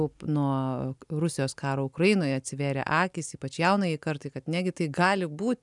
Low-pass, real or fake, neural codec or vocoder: 10.8 kHz; real; none